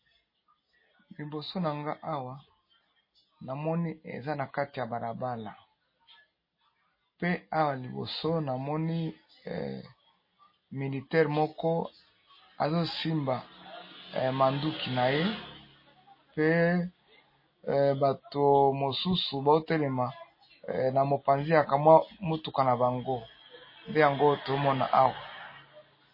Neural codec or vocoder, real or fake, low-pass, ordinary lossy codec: none; real; 5.4 kHz; MP3, 24 kbps